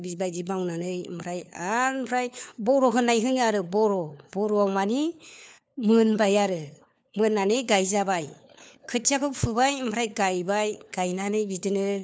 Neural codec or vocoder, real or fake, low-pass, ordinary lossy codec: codec, 16 kHz, 4 kbps, FunCodec, trained on LibriTTS, 50 frames a second; fake; none; none